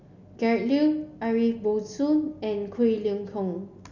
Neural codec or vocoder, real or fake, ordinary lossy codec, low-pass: none; real; none; 7.2 kHz